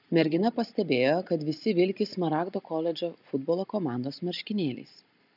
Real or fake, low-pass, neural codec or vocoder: real; 5.4 kHz; none